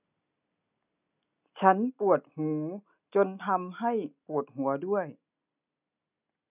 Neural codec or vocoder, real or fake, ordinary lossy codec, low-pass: none; real; none; 3.6 kHz